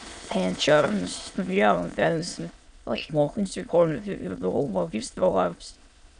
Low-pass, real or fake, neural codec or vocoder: 9.9 kHz; fake; autoencoder, 22.05 kHz, a latent of 192 numbers a frame, VITS, trained on many speakers